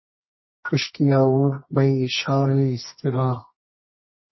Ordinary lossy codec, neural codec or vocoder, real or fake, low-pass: MP3, 24 kbps; codec, 24 kHz, 0.9 kbps, WavTokenizer, medium music audio release; fake; 7.2 kHz